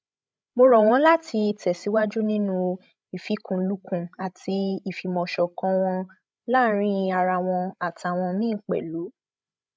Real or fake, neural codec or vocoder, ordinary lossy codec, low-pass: fake; codec, 16 kHz, 16 kbps, FreqCodec, larger model; none; none